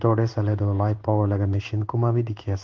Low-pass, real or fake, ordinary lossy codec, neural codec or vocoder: 7.2 kHz; fake; Opus, 16 kbps; codec, 16 kHz in and 24 kHz out, 1 kbps, XY-Tokenizer